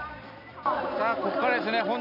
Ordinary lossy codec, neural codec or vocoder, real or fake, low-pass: none; none; real; 5.4 kHz